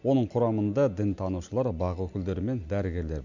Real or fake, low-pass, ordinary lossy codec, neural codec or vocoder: real; 7.2 kHz; none; none